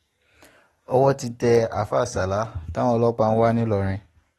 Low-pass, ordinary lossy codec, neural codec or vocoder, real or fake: 19.8 kHz; AAC, 32 kbps; codec, 44.1 kHz, 7.8 kbps, DAC; fake